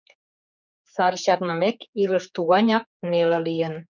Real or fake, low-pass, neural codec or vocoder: fake; 7.2 kHz; codec, 16 kHz, 4 kbps, X-Codec, HuBERT features, trained on general audio